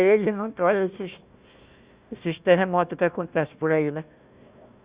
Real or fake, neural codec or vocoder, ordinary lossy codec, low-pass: fake; codec, 16 kHz, 1 kbps, FunCodec, trained on Chinese and English, 50 frames a second; Opus, 64 kbps; 3.6 kHz